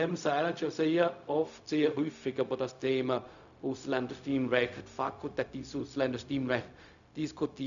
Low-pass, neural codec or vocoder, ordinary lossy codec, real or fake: 7.2 kHz; codec, 16 kHz, 0.4 kbps, LongCat-Audio-Codec; none; fake